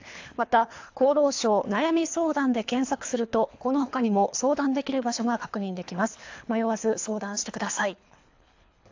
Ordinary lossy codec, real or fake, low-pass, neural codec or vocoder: AAC, 48 kbps; fake; 7.2 kHz; codec, 24 kHz, 3 kbps, HILCodec